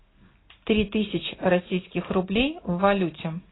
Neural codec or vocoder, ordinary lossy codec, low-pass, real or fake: none; AAC, 16 kbps; 7.2 kHz; real